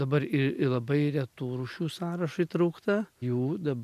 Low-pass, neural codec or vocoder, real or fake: 14.4 kHz; none; real